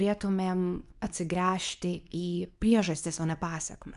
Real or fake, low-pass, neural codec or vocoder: fake; 10.8 kHz; codec, 24 kHz, 0.9 kbps, WavTokenizer, medium speech release version 2